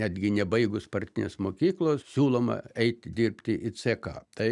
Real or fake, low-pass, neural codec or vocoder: real; 10.8 kHz; none